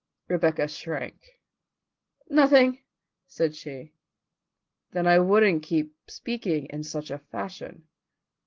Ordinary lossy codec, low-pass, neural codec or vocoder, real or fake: Opus, 16 kbps; 7.2 kHz; none; real